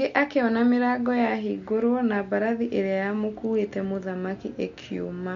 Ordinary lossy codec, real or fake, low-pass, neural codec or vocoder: MP3, 48 kbps; real; 7.2 kHz; none